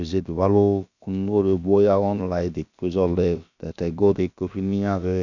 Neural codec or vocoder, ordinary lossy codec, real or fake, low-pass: codec, 16 kHz, about 1 kbps, DyCAST, with the encoder's durations; none; fake; 7.2 kHz